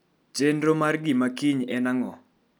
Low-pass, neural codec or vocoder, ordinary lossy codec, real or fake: none; none; none; real